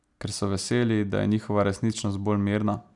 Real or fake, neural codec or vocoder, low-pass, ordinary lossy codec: real; none; 10.8 kHz; none